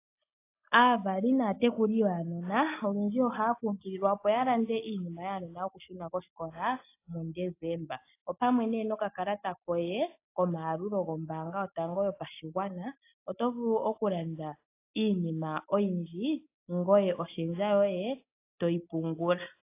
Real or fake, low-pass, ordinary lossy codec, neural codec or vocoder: real; 3.6 kHz; AAC, 24 kbps; none